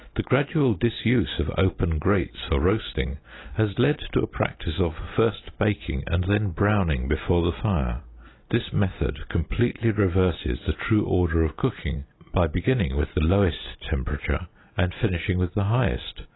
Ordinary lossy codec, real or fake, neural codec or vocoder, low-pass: AAC, 16 kbps; real; none; 7.2 kHz